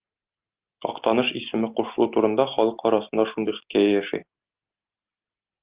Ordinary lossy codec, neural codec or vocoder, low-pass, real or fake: Opus, 32 kbps; none; 3.6 kHz; real